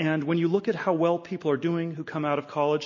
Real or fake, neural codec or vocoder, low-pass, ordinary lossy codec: real; none; 7.2 kHz; MP3, 32 kbps